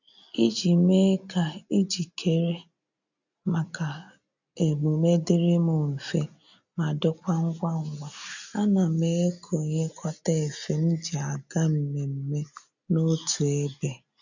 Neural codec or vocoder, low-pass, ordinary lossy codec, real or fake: none; 7.2 kHz; none; real